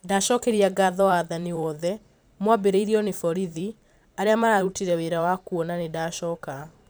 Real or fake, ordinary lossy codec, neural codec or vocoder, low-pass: fake; none; vocoder, 44.1 kHz, 128 mel bands every 512 samples, BigVGAN v2; none